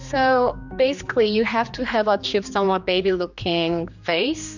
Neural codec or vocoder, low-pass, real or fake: codec, 16 kHz, 2 kbps, X-Codec, HuBERT features, trained on general audio; 7.2 kHz; fake